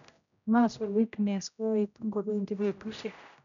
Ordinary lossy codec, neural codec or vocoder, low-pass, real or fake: MP3, 96 kbps; codec, 16 kHz, 0.5 kbps, X-Codec, HuBERT features, trained on general audio; 7.2 kHz; fake